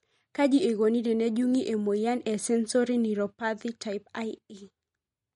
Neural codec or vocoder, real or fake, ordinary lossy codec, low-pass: none; real; MP3, 48 kbps; 19.8 kHz